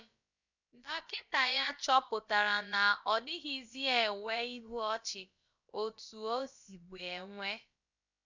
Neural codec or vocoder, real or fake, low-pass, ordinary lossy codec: codec, 16 kHz, about 1 kbps, DyCAST, with the encoder's durations; fake; 7.2 kHz; none